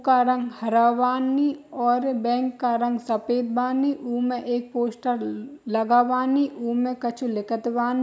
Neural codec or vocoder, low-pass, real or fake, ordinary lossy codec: none; none; real; none